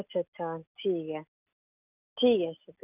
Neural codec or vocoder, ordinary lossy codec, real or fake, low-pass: none; none; real; 3.6 kHz